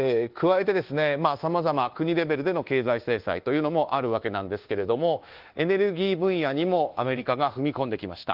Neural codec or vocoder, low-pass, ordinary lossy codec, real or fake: codec, 24 kHz, 1.2 kbps, DualCodec; 5.4 kHz; Opus, 16 kbps; fake